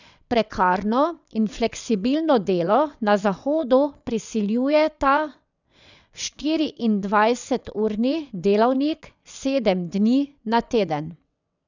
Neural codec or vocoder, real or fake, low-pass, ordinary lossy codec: vocoder, 22.05 kHz, 80 mel bands, WaveNeXt; fake; 7.2 kHz; none